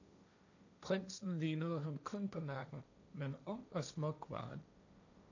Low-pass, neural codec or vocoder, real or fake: 7.2 kHz; codec, 16 kHz, 1.1 kbps, Voila-Tokenizer; fake